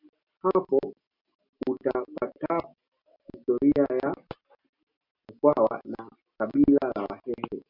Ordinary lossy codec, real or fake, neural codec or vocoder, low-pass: MP3, 48 kbps; real; none; 5.4 kHz